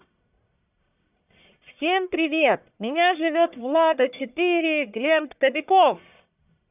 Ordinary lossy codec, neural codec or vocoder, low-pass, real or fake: none; codec, 44.1 kHz, 1.7 kbps, Pupu-Codec; 3.6 kHz; fake